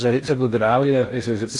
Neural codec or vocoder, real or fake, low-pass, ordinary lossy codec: codec, 16 kHz in and 24 kHz out, 0.6 kbps, FocalCodec, streaming, 4096 codes; fake; 10.8 kHz; AAC, 48 kbps